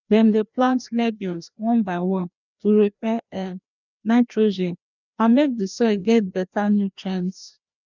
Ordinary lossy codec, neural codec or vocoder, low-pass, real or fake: none; codec, 16 kHz, 1 kbps, FreqCodec, larger model; 7.2 kHz; fake